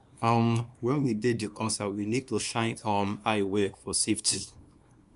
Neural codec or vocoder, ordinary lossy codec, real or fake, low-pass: codec, 24 kHz, 0.9 kbps, WavTokenizer, small release; none; fake; 10.8 kHz